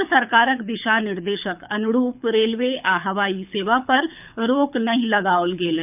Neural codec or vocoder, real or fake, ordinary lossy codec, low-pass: codec, 24 kHz, 6 kbps, HILCodec; fake; none; 3.6 kHz